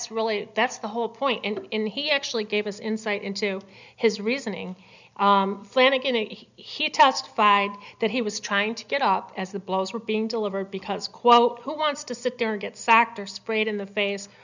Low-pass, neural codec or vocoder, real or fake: 7.2 kHz; none; real